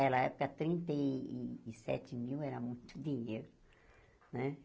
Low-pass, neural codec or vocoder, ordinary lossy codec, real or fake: none; none; none; real